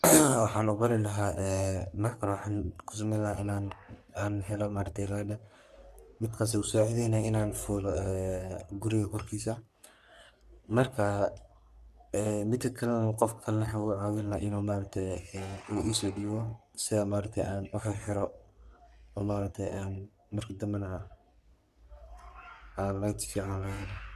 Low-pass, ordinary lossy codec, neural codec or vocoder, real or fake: 14.4 kHz; none; codec, 44.1 kHz, 3.4 kbps, Pupu-Codec; fake